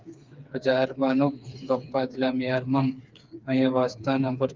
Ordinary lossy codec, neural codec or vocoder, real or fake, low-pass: Opus, 24 kbps; codec, 16 kHz, 4 kbps, FreqCodec, smaller model; fake; 7.2 kHz